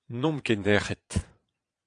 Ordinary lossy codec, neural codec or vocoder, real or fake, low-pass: AAC, 48 kbps; vocoder, 22.05 kHz, 80 mel bands, Vocos; fake; 9.9 kHz